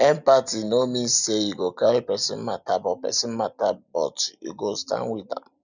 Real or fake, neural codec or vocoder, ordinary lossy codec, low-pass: real; none; none; 7.2 kHz